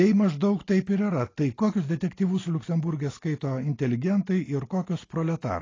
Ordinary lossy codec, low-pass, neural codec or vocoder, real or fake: AAC, 32 kbps; 7.2 kHz; none; real